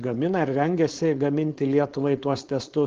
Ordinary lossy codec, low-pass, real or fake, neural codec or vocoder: Opus, 16 kbps; 7.2 kHz; fake; codec, 16 kHz, 4.8 kbps, FACodec